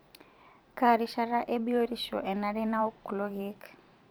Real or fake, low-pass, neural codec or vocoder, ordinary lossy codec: fake; none; vocoder, 44.1 kHz, 128 mel bands every 512 samples, BigVGAN v2; none